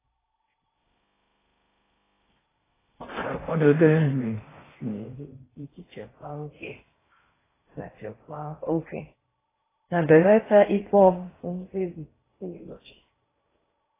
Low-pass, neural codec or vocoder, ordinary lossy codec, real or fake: 3.6 kHz; codec, 16 kHz in and 24 kHz out, 0.6 kbps, FocalCodec, streaming, 4096 codes; AAC, 16 kbps; fake